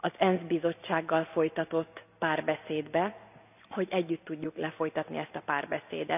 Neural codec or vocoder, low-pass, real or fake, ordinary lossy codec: none; 3.6 kHz; real; none